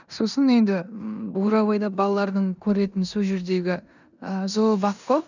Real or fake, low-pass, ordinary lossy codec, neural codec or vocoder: fake; 7.2 kHz; none; codec, 16 kHz in and 24 kHz out, 0.9 kbps, LongCat-Audio-Codec, four codebook decoder